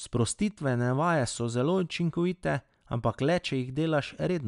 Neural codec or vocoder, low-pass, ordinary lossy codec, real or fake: none; 10.8 kHz; none; real